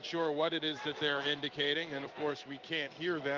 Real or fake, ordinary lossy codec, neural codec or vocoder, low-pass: fake; Opus, 24 kbps; codec, 16 kHz in and 24 kHz out, 1 kbps, XY-Tokenizer; 7.2 kHz